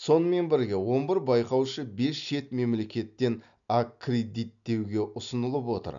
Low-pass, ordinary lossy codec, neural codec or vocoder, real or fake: 7.2 kHz; none; none; real